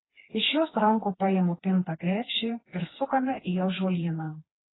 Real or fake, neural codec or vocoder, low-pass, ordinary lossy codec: fake; codec, 16 kHz, 2 kbps, FreqCodec, smaller model; 7.2 kHz; AAC, 16 kbps